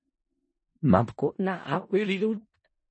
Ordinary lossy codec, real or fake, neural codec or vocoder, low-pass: MP3, 32 kbps; fake; codec, 16 kHz in and 24 kHz out, 0.4 kbps, LongCat-Audio-Codec, four codebook decoder; 9.9 kHz